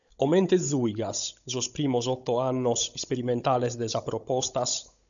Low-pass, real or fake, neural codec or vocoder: 7.2 kHz; fake; codec, 16 kHz, 16 kbps, FunCodec, trained on Chinese and English, 50 frames a second